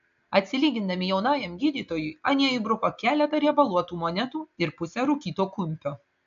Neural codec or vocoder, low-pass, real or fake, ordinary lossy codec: none; 7.2 kHz; real; AAC, 64 kbps